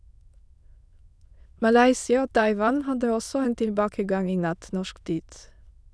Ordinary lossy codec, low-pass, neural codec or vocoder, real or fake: none; none; autoencoder, 22.05 kHz, a latent of 192 numbers a frame, VITS, trained on many speakers; fake